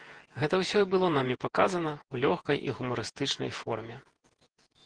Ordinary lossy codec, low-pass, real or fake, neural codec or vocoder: Opus, 16 kbps; 9.9 kHz; fake; vocoder, 48 kHz, 128 mel bands, Vocos